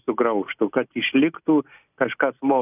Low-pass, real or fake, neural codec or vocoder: 3.6 kHz; real; none